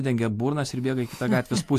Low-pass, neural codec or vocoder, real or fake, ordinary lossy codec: 14.4 kHz; none; real; AAC, 64 kbps